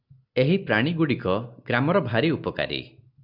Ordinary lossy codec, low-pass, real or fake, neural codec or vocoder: AAC, 48 kbps; 5.4 kHz; real; none